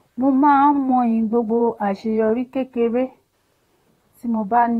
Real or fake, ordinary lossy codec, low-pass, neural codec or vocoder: fake; AAC, 48 kbps; 19.8 kHz; vocoder, 44.1 kHz, 128 mel bands, Pupu-Vocoder